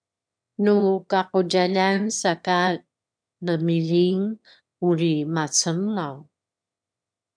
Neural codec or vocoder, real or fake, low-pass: autoencoder, 22.05 kHz, a latent of 192 numbers a frame, VITS, trained on one speaker; fake; 9.9 kHz